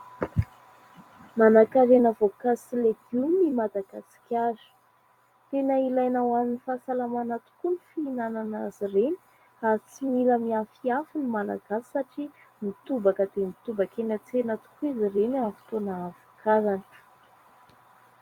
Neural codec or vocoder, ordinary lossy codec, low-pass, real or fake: vocoder, 44.1 kHz, 128 mel bands every 256 samples, BigVGAN v2; Opus, 64 kbps; 19.8 kHz; fake